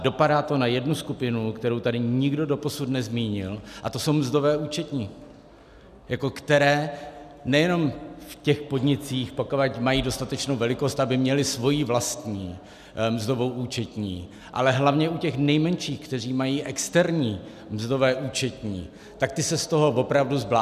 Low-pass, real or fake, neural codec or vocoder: 14.4 kHz; real; none